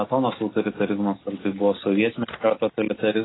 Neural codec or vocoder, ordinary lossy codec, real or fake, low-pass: none; AAC, 16 kbps; real; 7.2 kHz